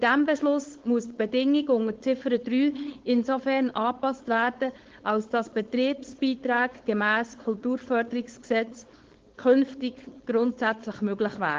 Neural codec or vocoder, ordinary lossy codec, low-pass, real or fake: codec, 16 kHz, 4.8 kbps, FACodec; Opus, 16 kbps; 7.2 kHz; fake